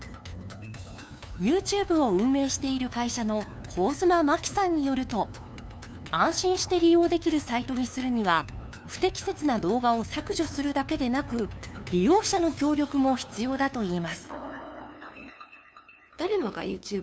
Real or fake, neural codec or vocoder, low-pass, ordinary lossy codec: fake; codec, 16 kHz, 2 kbps, FunCodec, trained on LibriTTS, 25 frames a second; none; none